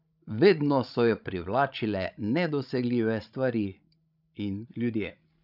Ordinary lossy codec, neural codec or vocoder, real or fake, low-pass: none; codec, 16 kHz, 16 kbps, FreqCodec, larger model; fake; 5.4 kHz